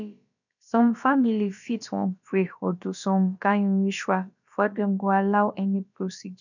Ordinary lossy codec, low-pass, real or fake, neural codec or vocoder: none; 7.2 kHz; fake; codec, 16 kHz, about 1 kbps, DyCAST, with the encoder's durations